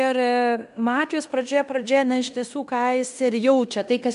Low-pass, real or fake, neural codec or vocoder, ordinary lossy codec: 10.8 kHz; fake; codec, 16 kHz in and 24 kHz out, 0.9 kbps, LongCat-Audio-Codec, fine tuned four codebook decoder; AAC, 96 kbps